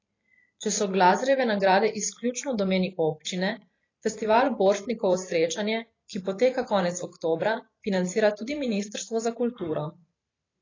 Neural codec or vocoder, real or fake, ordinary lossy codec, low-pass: vocoder, 44.1 kHz, 128 mel bands every 512 samples, BigVGAN v2; fake; AAC, 32 kbps; 7.2 kHz